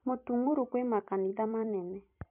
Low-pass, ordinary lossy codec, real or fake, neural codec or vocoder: 3.6 kHz; MP3, 32 kbps; fake; vocoder, 44.1 kHz, 128 mel bands every 256 samples, BigVGAN v2